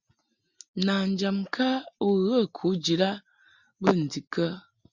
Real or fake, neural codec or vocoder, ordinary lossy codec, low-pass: real; none; Opus, 64 kbps; 7.2 kHz